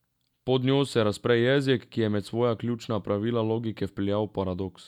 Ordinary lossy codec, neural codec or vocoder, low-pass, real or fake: none; none; 19.8 kHz; real